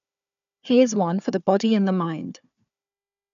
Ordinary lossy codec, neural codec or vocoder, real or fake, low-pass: none; codec, 16 kHz, 4 kbps, FunCodec, trained on Chinese and English, 50 frames a second; fake; 7.2 kHz